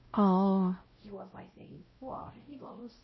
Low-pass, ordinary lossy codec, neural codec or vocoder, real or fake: 7.2 kHz; MP3, 24 kbps; codec, 16 kHz, 0.5 kbps, X-Codec, WavLM features, trained on Multilingual LibriSpeech; fake